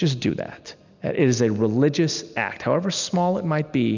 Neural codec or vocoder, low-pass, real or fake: none; 7.2 kHz; real